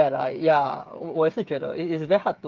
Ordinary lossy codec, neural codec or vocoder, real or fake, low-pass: Opus, 32 kbps; codec, 16 kHz, 8 kbps, FreqCodec, smaller model; fake; 7.2 kHz